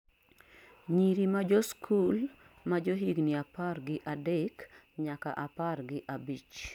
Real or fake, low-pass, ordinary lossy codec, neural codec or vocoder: real; 19.8 kHz; none; none